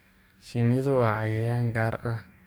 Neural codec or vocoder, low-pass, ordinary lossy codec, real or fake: codec, 44.1 kHz, 2.6 kbps, DAC; none; none; fake